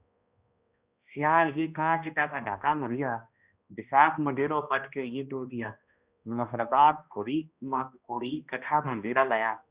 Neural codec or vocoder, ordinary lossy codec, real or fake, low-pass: codec, 16 kHz, 1 kbps, X-Codec, HuBERT features, trained on balanced general audio; Opus, 64 kbps; fake; 3.6 kHz